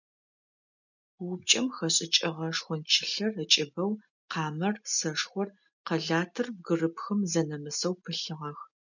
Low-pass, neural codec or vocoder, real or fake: 7.2 kHz; none; real